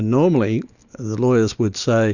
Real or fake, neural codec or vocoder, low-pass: real; none; 7.2 kHz